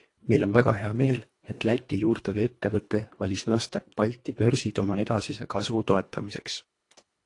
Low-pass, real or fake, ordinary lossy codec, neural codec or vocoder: 10.8 kHz; fake; AAC, 48 kbps; codec, 24 kHz, 1.5 kbps, HILCodec